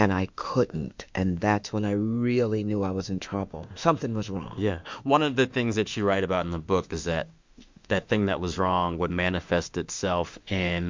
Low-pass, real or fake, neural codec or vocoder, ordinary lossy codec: 7.2 kHz; fake; autoencoder, 48 kHz, 32 numbers a frame, DAC-VAE, trained on Japanese speech; MP3, 64 kbps